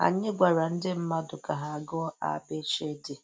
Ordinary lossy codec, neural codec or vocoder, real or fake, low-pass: none; none; real; none